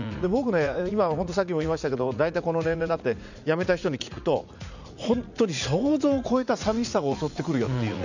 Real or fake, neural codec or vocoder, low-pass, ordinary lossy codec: fake; vocoder, 44.1 kHz, 80 mel bands, Vocos; 7.2 kHz; none